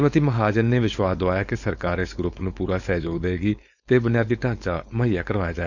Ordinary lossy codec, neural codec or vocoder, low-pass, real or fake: none; codec, 16 kHz, 4.8 kbps, FACodec; 7.2 kHz; fake